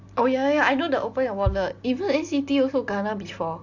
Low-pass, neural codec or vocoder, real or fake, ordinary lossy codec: 7.2 kHz; none; real; AAC, 48 kbps